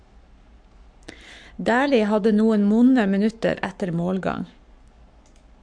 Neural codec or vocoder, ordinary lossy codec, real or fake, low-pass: codec, 44.1 kHz, 7.8 kbps, Pupu-Codec; MP3, 64 kbps; fake; 9.9 kHz